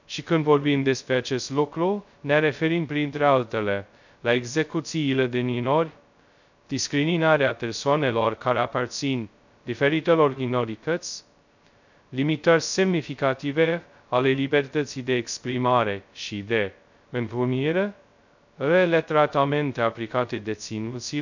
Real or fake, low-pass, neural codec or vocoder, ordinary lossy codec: fake; 7.2 kHz; codec, 16 kHz, 0.2 kbps, FocalCodec; none